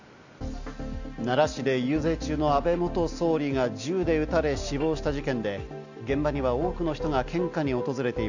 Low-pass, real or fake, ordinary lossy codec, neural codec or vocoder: 7.2 kHz; real; none; none